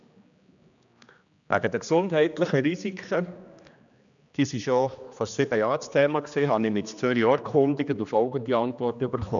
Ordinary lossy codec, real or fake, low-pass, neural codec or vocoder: none; fake; 7.2 kHz; codec, 16 kHz, 2 kbps, X-Codec, HuBERT features, trained on general audio